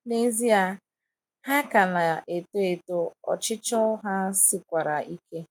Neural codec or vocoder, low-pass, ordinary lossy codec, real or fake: none; none; none; real